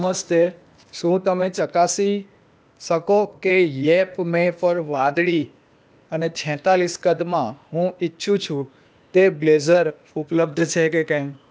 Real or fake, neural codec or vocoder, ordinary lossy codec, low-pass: fake; codec, 16 kHz, 0.8 kbps, ZipCodec; none; none